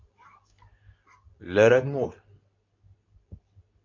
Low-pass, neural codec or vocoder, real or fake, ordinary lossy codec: 7.2 kHz; codec, 24 kHz, 0.9 kbps, WavTokenizer, medium speech release version 2; fake; MP3, 64 kbps